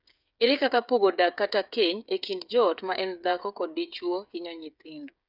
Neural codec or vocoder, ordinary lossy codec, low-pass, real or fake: codec, 16 kHz, 8 kbps, FreqCodec, smaller model; none; 5.4 kHz; fake